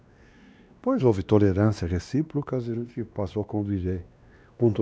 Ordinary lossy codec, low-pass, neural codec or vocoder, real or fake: none; none; codec, 16 kHz, 2 kbps, X-Codec, WavLM features, trained on Multilingual LibriSpeech; fake